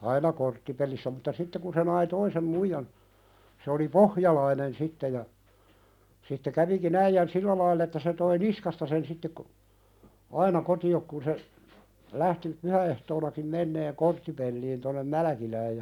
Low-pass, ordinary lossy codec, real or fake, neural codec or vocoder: 19.8 kHz; none; real; none